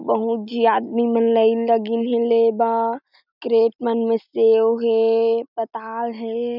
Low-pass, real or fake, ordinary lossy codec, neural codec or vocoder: 5.4 kHz; real; none; none